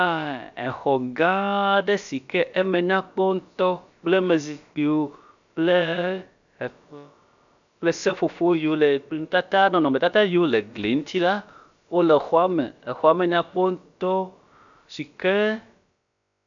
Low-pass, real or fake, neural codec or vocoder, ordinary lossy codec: 7.2 kHz; fake; codec, 16 kHz, about 1 kbps, DyCAST, with the encoder's durations; MP3, 96 kbps